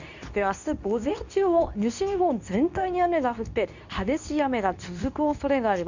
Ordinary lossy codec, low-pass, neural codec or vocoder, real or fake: none; 7.2 kHz; codec, 24 kHz, 0.9 kbps, WavTokenizer, medium speech release version 2; fake